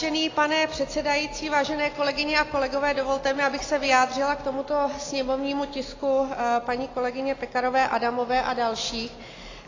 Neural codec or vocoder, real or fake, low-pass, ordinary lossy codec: none; real; 7.2 kHz; AAC, 32 kbps